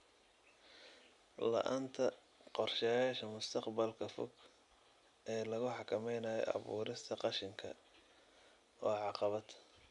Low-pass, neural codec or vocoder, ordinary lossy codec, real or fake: 10.8 kHz; none; none; real